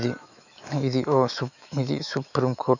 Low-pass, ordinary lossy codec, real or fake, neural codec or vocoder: 7.2 kHz; none; fake; autoencoder, 48 kHz, 128 numbers a frame, DAC-VAE, trained on Japanese speech